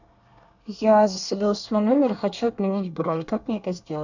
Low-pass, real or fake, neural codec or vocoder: 7.2 kHz; fake; codec, 24 kHz, 1 kbps, SNAC